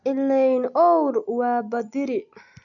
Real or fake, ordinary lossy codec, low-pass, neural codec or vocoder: real; none; 7.2 kHz; none